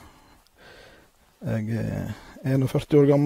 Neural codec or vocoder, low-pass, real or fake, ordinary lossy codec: none; 19.8 kHz; real; AAC, 48 kbps